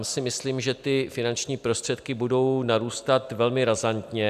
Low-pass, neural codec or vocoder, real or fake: 14.4 kHz; none; real